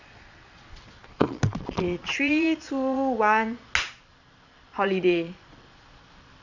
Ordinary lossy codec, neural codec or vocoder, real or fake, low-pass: none; vocoder, 22.05 kHz, 80 mel bands, Vocos; fake; 7.2 kHz